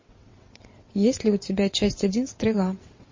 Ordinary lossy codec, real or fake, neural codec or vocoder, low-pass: MP3, 32 kbps; fake; vocoder, 22.05 kHz, 80 mel bands, WaveNeXt; 7.2 kHz